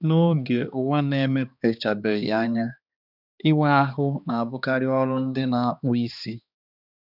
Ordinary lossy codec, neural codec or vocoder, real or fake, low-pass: none; codec, 16 kHz, 2 kbps, X-Codec, HuBERT features, trained on balanced general audio; fake; 5.4 kHz